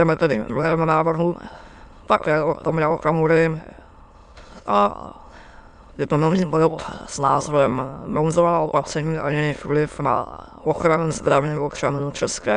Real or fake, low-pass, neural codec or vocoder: fake; 9.9 kHz; autoencoder, 22.05 kHz, a latent of 192 numbers a frame, VITS, trained on many speakers